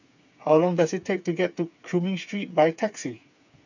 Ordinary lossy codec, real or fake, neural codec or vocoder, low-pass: none; fake; codec, 16 kHz, 8 kbps, FreqCodec, smaller model; 7.2 kHz